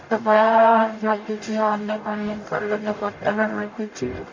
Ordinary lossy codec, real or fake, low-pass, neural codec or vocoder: MP3, 48 kbps; fake; 7.2 kHz; codec, 44.1 kHz, 0.9 kbps, DAC